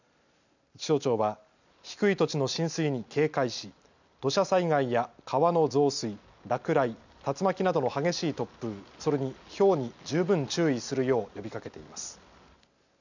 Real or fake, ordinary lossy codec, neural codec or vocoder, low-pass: real; none; none; 7.2 kHz